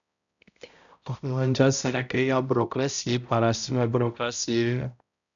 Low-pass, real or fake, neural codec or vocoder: 7.2 kHz; fake; codec, 16 kHz, 0.5 kbps, X-Codec, HuBERT features, trained on balanced general audio